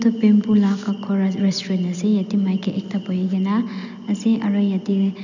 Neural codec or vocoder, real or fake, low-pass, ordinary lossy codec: none; real; 7.2 kHz; none